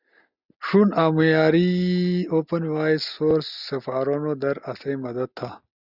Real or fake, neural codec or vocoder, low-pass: real; none; 5.4 kHz